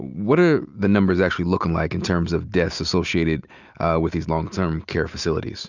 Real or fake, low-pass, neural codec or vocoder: real; 7.2 kHz; none